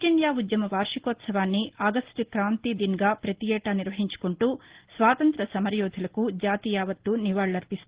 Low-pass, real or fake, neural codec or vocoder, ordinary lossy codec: 3.6 kHz; real; none; Opus, 16 kbps